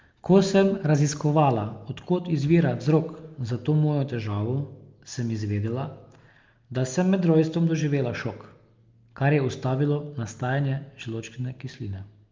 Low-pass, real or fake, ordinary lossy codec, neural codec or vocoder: 7.2 kHz; real; Opus, 32 kbps; none